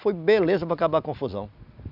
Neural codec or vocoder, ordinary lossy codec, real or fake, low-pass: none; none; real; 5.4 kHz